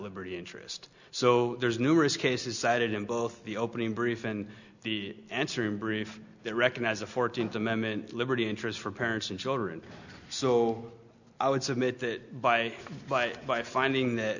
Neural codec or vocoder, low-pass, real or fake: none; 7.2 kHz; real